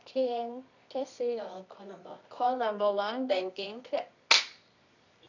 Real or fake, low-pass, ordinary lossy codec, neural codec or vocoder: fake; 7.2 kHz; none; codec, 24 kHz, 0.9 kbps, WavTokenizer, medium music audio release